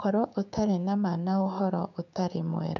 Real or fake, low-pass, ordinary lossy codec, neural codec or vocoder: fake; 7.2 kHz; none; codec, 16 kHz, 6 kbps, DAC